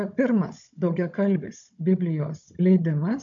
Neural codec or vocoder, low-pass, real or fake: codec, 16 kHz, 16 kbps, FunCodec, trained on Chinese and English, 50 frames a second; 7.2 kHz; fake